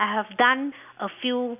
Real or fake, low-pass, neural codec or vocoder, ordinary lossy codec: real; 3.6 kHz; none; none